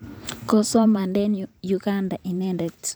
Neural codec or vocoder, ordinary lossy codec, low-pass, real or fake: vocoder, 44.1 kHz, 128 mel bands every 512 samples, BigVGAN v2; none; none; fake